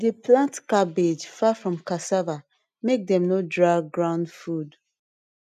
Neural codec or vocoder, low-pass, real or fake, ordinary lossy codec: none; 14.4 kHz; real; none